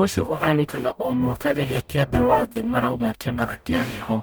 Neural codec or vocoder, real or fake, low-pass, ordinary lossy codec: codec, 44.1 kHz, 0.9 kbps, DAC; fake; none; none